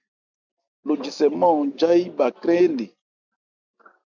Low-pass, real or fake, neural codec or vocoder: 7.2 kHz; real; none